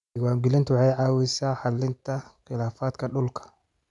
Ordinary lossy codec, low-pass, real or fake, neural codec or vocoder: none; 10.8 kHz; real; none